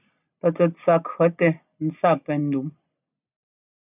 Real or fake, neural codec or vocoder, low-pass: real; none; 3.6 kHz